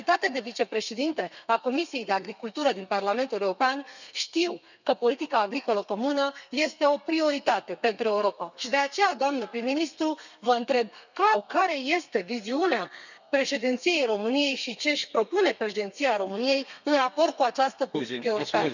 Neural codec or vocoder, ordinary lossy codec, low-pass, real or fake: codec, 32 kHz, 1.9 kbps, SNAC; none; 7.2 kHz; fake